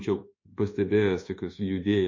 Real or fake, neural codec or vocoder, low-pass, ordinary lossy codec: fake; codec, 24 kHz, 1.2 kbps, DualCodec; 7.2 kHz; MP3, 32 kbps